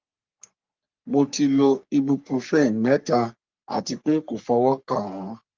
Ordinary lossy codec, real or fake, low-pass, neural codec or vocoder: Opus, 24 kbps; fake; 7.2 kHz; codec, 44.1 kHz, 3.4 kbps, Pupu-Codec